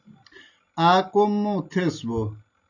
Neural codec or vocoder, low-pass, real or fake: none; 7.2 kHz; real